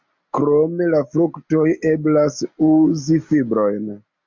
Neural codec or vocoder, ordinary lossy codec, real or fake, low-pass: none; MP3, 64 kbps; real; 7.2 kHz